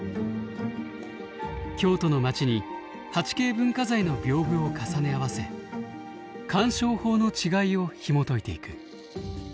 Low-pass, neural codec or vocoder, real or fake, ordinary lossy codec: none; none; real; none